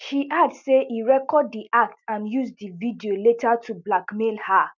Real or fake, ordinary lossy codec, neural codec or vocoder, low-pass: real; none; none; 7.2 kHz